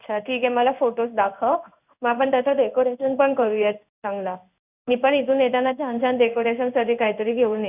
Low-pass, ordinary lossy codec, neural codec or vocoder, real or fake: 3.6 kHz; none; codec, 16 kHz in and 24 kHz out, 1 kbps, XY-Tokenizer; fake